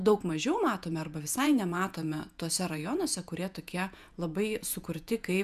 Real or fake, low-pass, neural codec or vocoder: fake; 14.4 kHz; vocoder, 48 kHz, 128 mel bands, Vocos